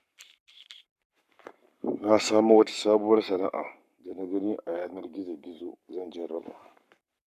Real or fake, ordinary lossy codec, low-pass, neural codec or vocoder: fake; none; 14.4 kHz; codec, 44.1 kHz, 7.8 kbps, Pupu-Codec